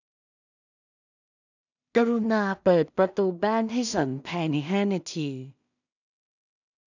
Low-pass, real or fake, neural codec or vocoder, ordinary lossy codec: 7.2 kHz; fake; codec, 16 kHz in and 24 kHz out, 0.4 kbps, LongCat-Audio-Codec, two codebook decoder; none